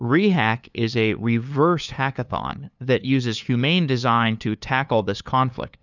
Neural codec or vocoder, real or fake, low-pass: codec, 16 kHz, 2 kbps, FunCodec, trained on LibriTTS, 25 frames a second; fake; 7.2 kHz